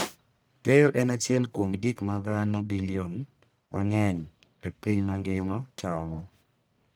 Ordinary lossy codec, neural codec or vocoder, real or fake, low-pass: none; codec, 44.1 kHz, 1.7 kbps, Pupu-Codec; fake; none